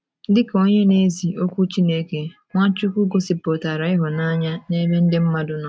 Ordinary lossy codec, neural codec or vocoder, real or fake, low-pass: none; none; real; none